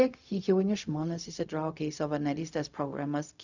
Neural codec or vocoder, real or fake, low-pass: codec, 16 kHz, 0.4 kbps, LongCat-Audio-Codec; fake; 7.2 kHz